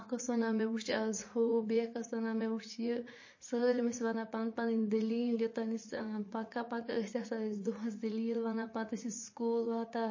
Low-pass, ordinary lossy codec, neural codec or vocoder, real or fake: 7.2 kHz; MP3, 32 kbps; vocoder, 44.1 kHz, 80 mel bands, Vocos; fake